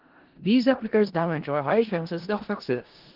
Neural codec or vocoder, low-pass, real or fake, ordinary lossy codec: codec, 16 kHz in and 24 kHz out, 0.4 kbps, LongCat-Audio-Codec, four codebook decoder; 5.4 kHz; fake; Opus, 16 kbps